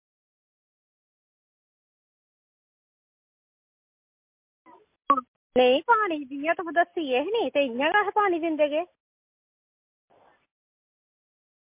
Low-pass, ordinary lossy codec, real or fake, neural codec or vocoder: 3.6 kHz; MP3, 32 kbps; real; none